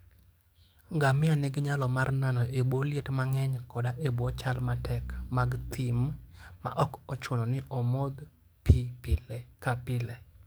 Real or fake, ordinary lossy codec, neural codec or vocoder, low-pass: fake; none; codec, 44.1 kHz, 7.8 kbps, DAC; none